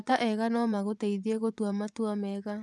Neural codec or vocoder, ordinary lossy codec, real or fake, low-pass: vocoder, 24 kHz, 100 mel bands, Vocos; none; fake; none